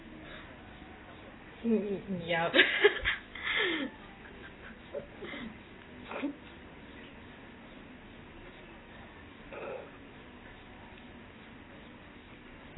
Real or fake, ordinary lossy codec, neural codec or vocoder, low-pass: real; AAC, 16 kbps; none; 7.2 kHz